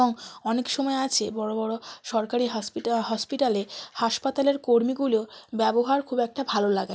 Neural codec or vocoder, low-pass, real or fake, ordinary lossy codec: none; none; real; none